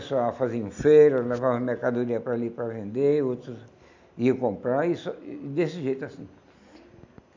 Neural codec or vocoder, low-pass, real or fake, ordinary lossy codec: none; 7.2 kHz; real; MP3, 64 kbps